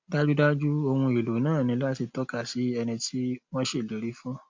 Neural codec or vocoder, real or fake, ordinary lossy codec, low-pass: none; real; MP3, 64 kbps; 7.2 kHz